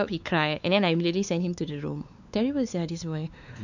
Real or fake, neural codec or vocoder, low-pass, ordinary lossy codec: fake; codec, 16 kHz, 4 kbps, X-Codec, WavLM features, trained on Multilingual LibriSpeech; 7.2 kHz; none